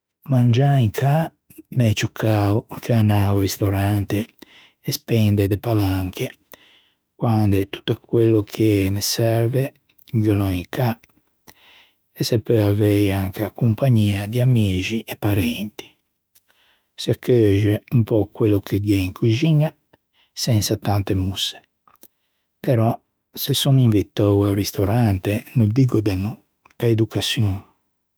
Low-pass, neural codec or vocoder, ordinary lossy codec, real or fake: none; autoencoder, 48 kHz, 32 numbers a frame, DAC-VAE, trained on Japanese speech; none; fake